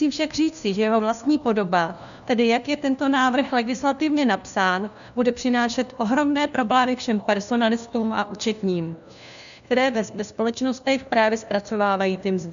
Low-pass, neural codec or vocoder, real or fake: 7.2 kHz; codec, 16 kHz, 1 kbps, FunCodec, trained on LibriTTS, 50 frames a second; fake